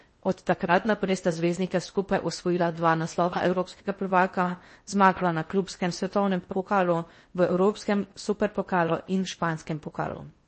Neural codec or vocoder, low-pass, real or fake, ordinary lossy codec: codec, 16 kHz in and 24 kHz out, 0.6 kbps, FocalCodec, streaming, 4096 codes; 10.8 kHz; fake; MP3, 32 kbps